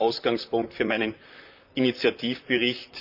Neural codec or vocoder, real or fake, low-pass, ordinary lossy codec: vocoder, 44.1 kHz, 128 mel bands, Pupu-Vocoder; fake; 5.4 kHz; none